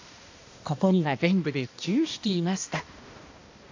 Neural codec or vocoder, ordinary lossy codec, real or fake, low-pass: codec, 16 kHz, 1 kbps, X-Codec, HuBERT features, trained on balanced general audio; AAC, 48 kbps; fake; 7.2 kHz